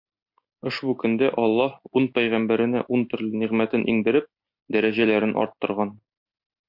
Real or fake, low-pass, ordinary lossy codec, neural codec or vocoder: real; 5.4 kHz; MP3, 48 kbps; none